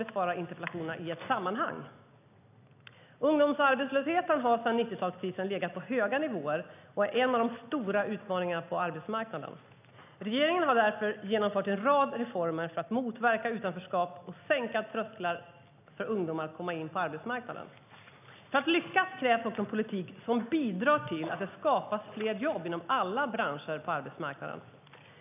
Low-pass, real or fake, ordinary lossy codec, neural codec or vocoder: 3.6 kHz; real; none; none